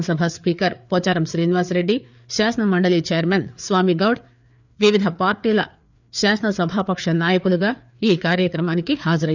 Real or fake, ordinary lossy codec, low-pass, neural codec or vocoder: fake; none; 7.2 kHz; codec, 16 kHz, 4 kbps, FreqCodec, larger model